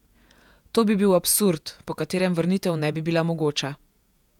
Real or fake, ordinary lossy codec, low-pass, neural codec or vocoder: fake; none; 19.8 kHz; vocoder, 48 kHz, 128 mel bands, Vocos